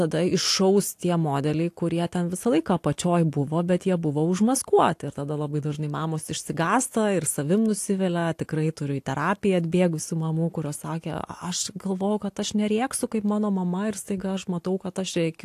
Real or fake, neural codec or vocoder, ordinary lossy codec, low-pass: real; none; AAC, 64 kbps; 14.4 kHz